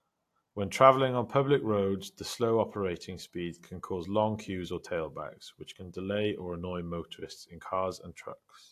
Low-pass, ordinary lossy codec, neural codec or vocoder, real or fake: 14.4 kHz; AAC, 64 kbps; none; real